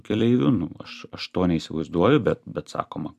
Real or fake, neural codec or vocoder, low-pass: fake; vocoder, 44.1 kHz, 128 mel bands every 256 samples, BigVGAN v2; 14.4 kHz